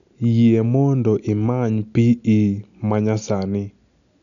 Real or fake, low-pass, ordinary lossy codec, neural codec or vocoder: real; 7.2 kHz; none; none